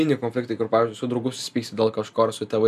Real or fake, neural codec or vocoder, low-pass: real; none; 14.4 kHz